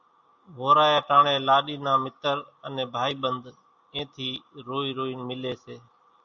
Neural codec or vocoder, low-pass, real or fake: none; 7.2 kHz; real